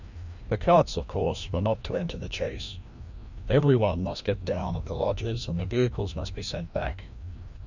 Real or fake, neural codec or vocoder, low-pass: fake; codec, 16 kHz, 1 kbps, FreqCodec, larger model; 7.2 kHz